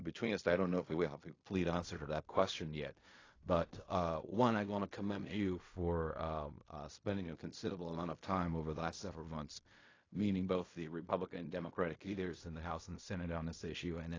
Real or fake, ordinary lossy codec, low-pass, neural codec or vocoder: fake; AAC, 32 kbps; 7.2 kHz; codec, 16 kHz in and 24 kHz out, 0.4 kbps, LongCat-Audio-Codec, fine tuned four codebook decoder